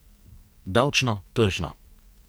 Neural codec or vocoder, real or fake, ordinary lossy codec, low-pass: codec, 44.1 kHz, 2.6 kbps, SNAC; fake; none; none